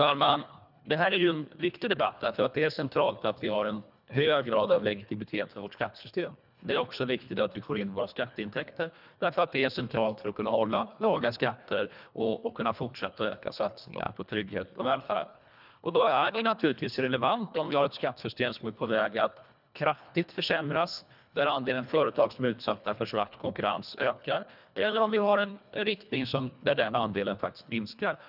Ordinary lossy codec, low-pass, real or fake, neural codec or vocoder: none; 5.4 kHz; fake; codec, 24 kHz, 1.5 kbps, HILCodec